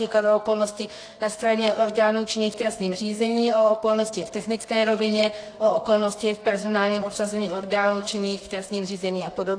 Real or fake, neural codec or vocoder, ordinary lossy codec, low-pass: fake; codec, 24 kHz, 0.9 kbps, WavTokenizer, medium music audio release; AAC, 48 kbps; 9.9 kHz